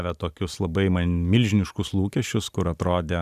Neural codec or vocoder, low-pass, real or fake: none; 14.4 kHz; real